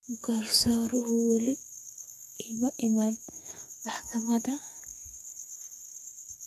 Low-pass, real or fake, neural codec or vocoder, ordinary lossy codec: 14.4 kHz; fake; codec, 32 kHz, 1.9 kbps, SNAC; none